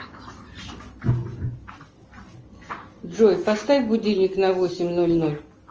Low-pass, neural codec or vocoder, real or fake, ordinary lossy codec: 7.2 kHz; none; real; Opus, 24 kbps